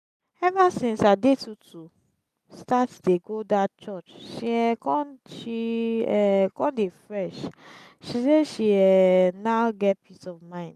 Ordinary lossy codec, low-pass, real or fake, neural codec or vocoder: none; 14.4 kHz; real; none